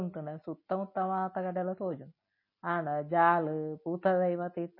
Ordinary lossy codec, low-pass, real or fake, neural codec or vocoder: MP3, 24 kbps; 5.4 kHz; real; none